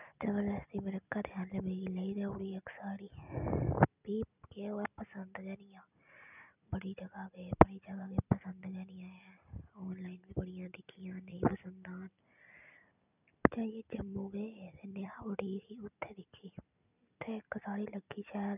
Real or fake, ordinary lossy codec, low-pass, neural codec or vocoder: real; none; 3.6 kHz; none